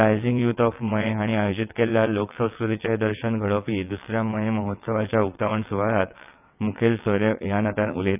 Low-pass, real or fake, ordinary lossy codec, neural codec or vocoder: 3.6 kHz; fake; none; vocoder, 22.05 kHz, 80 mel bands, WaveNeXt